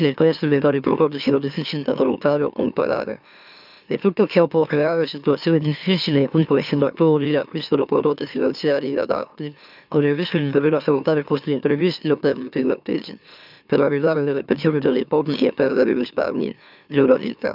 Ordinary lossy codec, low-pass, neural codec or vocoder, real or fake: none; 5.4 kHz; autoencoder, 44.1 kHz, a latent of 192 numbers a frame, MeloTTS; fake